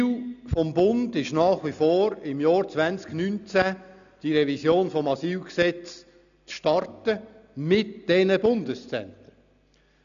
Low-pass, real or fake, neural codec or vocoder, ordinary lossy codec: 7.2 kHz; real; none; none